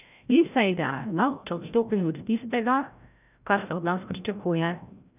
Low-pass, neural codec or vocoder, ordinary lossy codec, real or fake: 3.6 kHz; codec, 16 kHz, 0.5 kbps, FreqCodec, larger model; none; fake